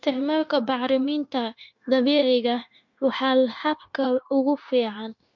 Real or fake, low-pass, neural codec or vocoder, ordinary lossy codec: fake; 7.2 kHz; codec, 16 kHz, 0.9 kbps, LongCat-Audio-Codec; MP3, 48 kbps